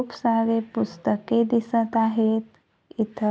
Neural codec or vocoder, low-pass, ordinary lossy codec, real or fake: none; none; none; real